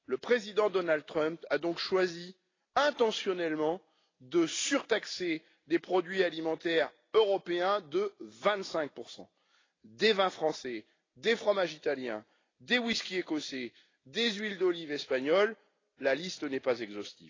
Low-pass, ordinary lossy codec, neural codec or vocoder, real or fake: 7.2 kHz; AAC, 32 kbps; none; real